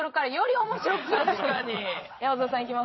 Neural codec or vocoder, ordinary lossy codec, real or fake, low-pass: vocoder, 22.05 kHz, 80 mel bands, Vocos; MP3, 24 kbps; fake; 7.2 kHz